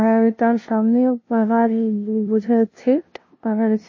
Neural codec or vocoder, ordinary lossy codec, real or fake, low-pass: codec, 16 kHz, 0.5 kbps, FunCodec, trained on LibriTTS, 25 frames a second; MP3, 32 kbps; fake; 7.2 kHz